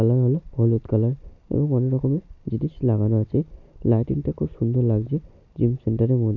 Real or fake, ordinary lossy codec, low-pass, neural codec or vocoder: real; none; 7.2 kHz; none